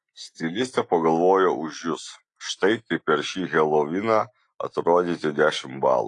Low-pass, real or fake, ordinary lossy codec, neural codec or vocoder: 10.8 kHz; real; AAC, 48 kbps; none